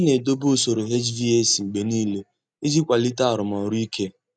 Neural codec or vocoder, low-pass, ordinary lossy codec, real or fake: none; 9.9 kHz; none; real